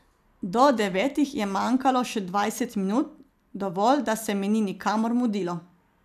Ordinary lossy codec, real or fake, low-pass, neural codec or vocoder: none; fake; 14.4 kHz; vocoder, 44.1 kHz, 128 mel bands every 256 samples, BigVGAN v2